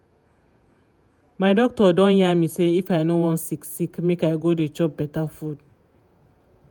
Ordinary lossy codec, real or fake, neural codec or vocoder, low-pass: none; fake; vocoder, 48 kHz, 128 mel bands, Vocos; none